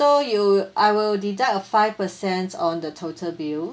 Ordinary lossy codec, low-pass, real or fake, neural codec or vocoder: none; none; real; none